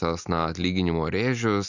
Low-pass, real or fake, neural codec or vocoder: 7.2 kHz; real; none